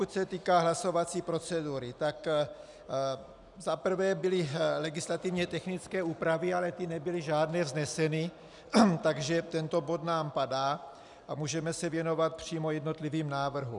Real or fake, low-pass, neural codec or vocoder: real; 10.8 kHz; none